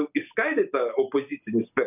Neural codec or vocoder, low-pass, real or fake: none; 3.6 kHz; real